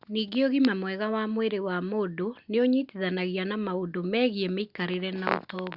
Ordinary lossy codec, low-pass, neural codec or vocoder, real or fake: Opus, 64 kbps; 5.4 kHz; none; real